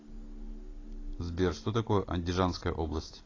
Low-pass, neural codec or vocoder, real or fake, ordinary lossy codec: 7.2 kHz; none; real; AAC, 32 kbps